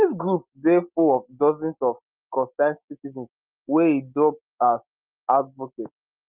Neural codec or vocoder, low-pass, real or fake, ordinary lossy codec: none; 3.6 kHz; real; Opus, 32 kbps